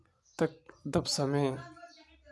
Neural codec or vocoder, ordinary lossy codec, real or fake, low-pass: none; none; real; none